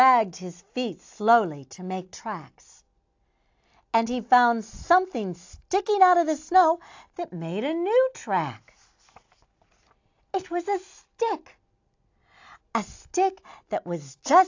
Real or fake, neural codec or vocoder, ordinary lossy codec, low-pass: real; none; AAC, 48 kbps; 7.2 kHz